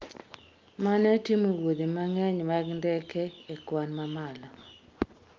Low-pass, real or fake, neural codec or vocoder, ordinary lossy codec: 7.2 kHz; real; none; Opus, 24 kbps